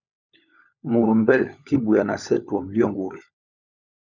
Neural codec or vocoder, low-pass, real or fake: codec, 16 kHz, 16 kbps, FunCodec, trained on LibriTTS, 50 frames a second; 7.2 kHz; fake